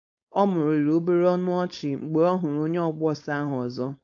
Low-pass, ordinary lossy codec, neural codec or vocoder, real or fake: 7.2 kHz; AAC, 48 kbps; codec, 16 kHz, 4.8 kbps, FACodec; fake